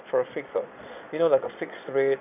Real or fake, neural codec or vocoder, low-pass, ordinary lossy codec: fake; vocoder, 22.05 kHz, 80 mel bands, Vocos; 3.6 kHz; none